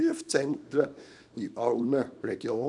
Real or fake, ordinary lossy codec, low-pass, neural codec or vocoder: fake; none; 10.8 kHz; codec, 24 kHz, 0.9 kbps, WavTokenizer, small release